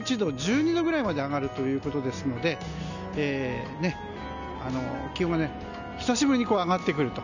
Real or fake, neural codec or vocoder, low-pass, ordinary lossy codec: real; none; 7.2 kHz; none